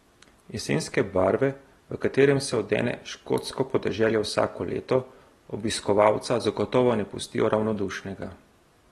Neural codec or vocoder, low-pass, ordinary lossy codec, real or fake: none; 19.8 kHz; AAC, 32 kbps; real